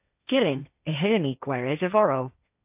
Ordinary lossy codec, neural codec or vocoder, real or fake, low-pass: AAC, 32 kbps; codec, 16 kHz, 1.1 kbps, Voila-Tokenizer; fake; 3.6 kHz